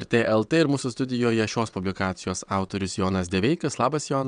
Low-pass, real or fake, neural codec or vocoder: 9.9 kHz; fake; vocoder, 22.05 kHz, 80 mel bands, WaveNeXt